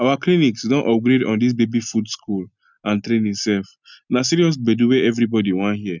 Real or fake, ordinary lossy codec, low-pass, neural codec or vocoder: real; none; 7.2 kHz; none